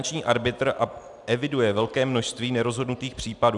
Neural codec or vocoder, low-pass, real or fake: none; 10.8 kHz; real